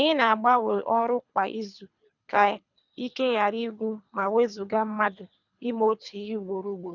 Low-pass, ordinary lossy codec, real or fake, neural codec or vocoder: 7.2 kHz; none; fake; codec, 24 kHz, 3 kbps, HILCodec